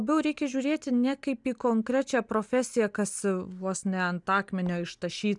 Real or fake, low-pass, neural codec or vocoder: real; 10.8 kHz; none